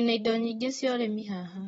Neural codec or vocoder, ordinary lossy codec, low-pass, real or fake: vocoder, 44.1 kHz, 128 mel bands, Pupu-Vocoder; AAC, 24 kbps; 19.8 kHz; fake